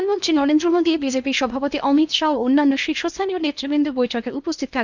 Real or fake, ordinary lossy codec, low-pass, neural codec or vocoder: fake; none; 7.2 kHz; codec, 16 kHz in and 24 kHz out, 0.8 kbps, FocalCodec, streaming, 65536 codes